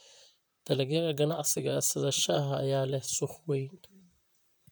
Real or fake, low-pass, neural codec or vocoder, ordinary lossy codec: fake; none; vocoder, 44.1 kHz, 128 mel bands, Pupu-Vocoder; none